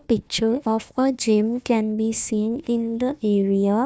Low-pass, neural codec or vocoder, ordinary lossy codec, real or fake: none; codec, 16 kHz, 1 kbps, FunCodec, trained on Chinese and English, 50 frames a second; none; fake